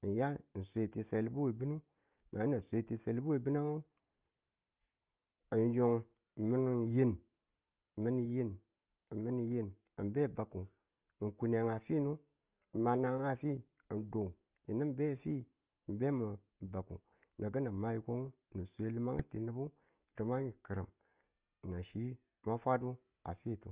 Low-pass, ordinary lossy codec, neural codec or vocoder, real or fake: 3.6 kHz; Opus, 24 kbps; none; real